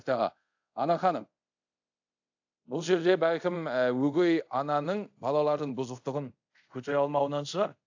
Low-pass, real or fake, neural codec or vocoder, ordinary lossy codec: 7.2 kHz; fake; codec, 24 kHz, 0.5 kbps, DualCodec; AAC, 48 kbps